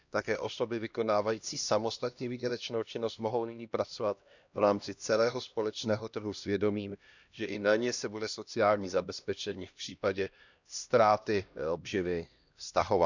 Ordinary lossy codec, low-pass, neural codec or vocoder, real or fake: none; 7.2 kHz; codec, 16 kHz, 1 kbps, X-Codec, HuBERT features, trained on LibriSpeech; fake